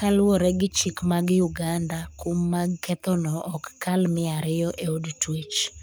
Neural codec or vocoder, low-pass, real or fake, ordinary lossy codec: codec, 44.1 kHz, 7.8 kbps, Pupu-Codec; none; fake; none